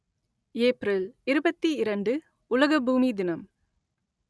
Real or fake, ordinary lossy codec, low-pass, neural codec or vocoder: real; none; none; none